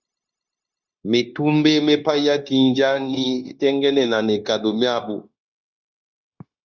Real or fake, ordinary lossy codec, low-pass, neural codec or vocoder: fake; Opus, 64 kbps; 7.2 kHz; codec, 16 kHz, 0.9 kbps, LongCat-Audio-Codec